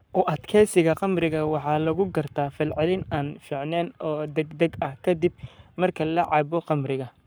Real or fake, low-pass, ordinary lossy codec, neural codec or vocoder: fake; none; none; codec, 44.1 kHz, 7.8 kbps, Pupu-Codec